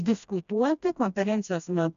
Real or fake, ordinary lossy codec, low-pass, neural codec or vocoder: fake; MP3, 96 kbps; 7.2 kHz; codec, 16 kHz, 1 kbps, FreqCodec, smaller model